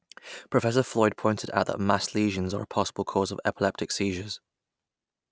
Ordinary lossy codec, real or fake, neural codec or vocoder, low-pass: none; real; none; none